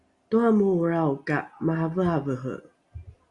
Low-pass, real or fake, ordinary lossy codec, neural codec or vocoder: 10.8 kHz; real; Opus, 64 kbps; none